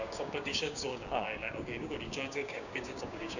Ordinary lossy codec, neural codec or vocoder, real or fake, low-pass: none; codec, 16 kHz, 6 kbps, DAC; fake; 7.2 kHz